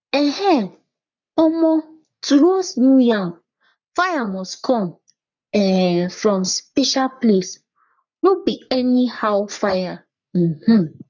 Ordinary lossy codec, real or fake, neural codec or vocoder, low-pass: none; fake; codec, 44.1 kHz, 3.4 kbps, Pupu-Codec; 7.2 kHz